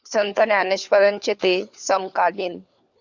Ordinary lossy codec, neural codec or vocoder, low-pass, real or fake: Opus, 64 kbps; codec, 16 kHz, 4 kbps, FunCodec, trained on LibriTTS, 50 frames a second; 7.2 kHz; fake